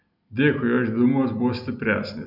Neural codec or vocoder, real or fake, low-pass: none; real; 5.4 kHz